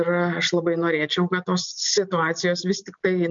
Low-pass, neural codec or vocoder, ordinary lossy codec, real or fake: 7.2 kHz; none; MP3, 64 kbps; real